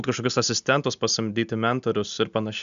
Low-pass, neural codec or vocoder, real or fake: 7.2 kHz; none; real